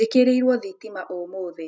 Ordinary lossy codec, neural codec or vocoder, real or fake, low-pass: none; none; real; none